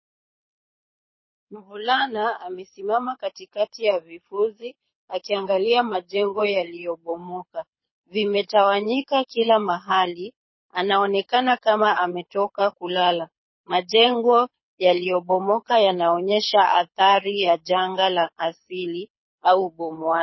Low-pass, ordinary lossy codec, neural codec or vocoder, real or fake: 7.2 kHz; MP3, 24 kbps; codec, 24 kHz, 6 kbps, HILCodec; fake